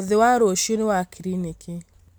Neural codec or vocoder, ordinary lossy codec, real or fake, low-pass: none; none; real; none